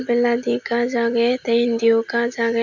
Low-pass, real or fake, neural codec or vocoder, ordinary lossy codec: 7.2 kHz; real; none; none